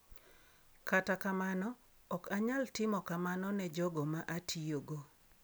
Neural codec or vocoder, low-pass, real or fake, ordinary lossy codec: none; none; real; none